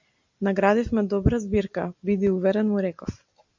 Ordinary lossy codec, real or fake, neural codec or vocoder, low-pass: MP3, 64 kbps; real; none; 7.2 kHz